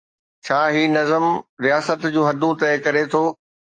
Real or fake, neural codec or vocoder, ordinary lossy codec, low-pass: fake; codec, 44.1 kHz, 7.8 kbps, DAC; AAC, 48 kbps; 9.9 kHz